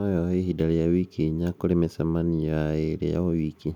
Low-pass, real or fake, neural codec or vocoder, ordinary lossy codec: 19.8 kHz; real; none; none